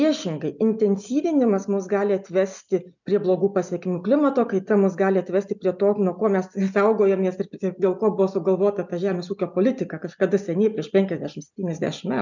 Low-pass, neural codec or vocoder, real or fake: 7.2 kHz; none; real